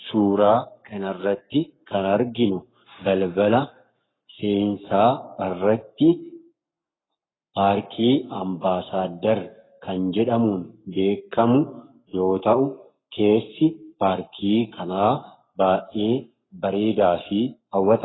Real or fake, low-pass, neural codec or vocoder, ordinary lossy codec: fake; 7.2 kHz; codec, 44.1 kHz, 7.8 kbps, Pupu-Codec; AAC, 16 kbps